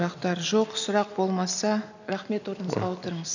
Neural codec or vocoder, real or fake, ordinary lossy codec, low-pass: none; real; none; 7.2 kHz